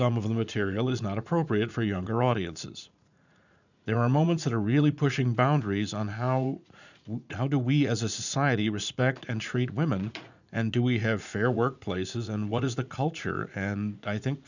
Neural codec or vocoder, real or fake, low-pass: vocoder, 44.1 kHz, 80 mel bands, Vocos; fake; 7.2 kHz